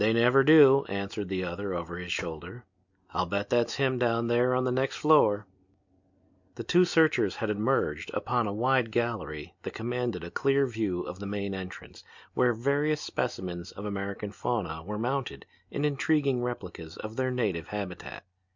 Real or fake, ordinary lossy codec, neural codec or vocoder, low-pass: real; MP3, 64 kbps; none; 7.2 kHz